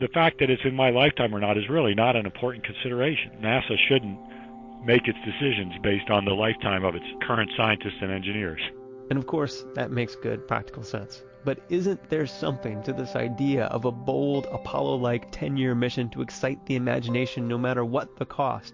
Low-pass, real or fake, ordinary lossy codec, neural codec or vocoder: 7.2 kHz; real; MP3, 48 kbps; none